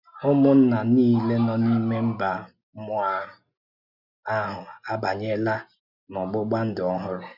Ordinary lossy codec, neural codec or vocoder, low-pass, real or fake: none; none; 5.4 kHz; real